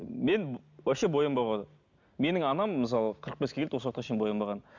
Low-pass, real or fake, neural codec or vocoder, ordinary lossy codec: 7.2 kHz; real; none; none